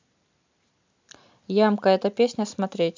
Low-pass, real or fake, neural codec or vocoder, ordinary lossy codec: 7.2 kHz; real; none; MP3, 64 kbps